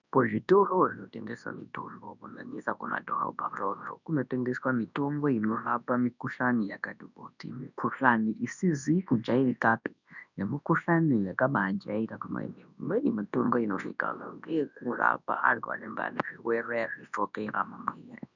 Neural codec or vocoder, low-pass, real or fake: codec, 24 kHz, 0.9 kbps, WavTokenizer, large speech release; 7.2 kHz; fake